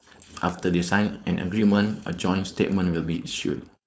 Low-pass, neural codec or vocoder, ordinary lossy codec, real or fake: none; codec, 16 kHz, 4.8 kbps, FACodec; none; fake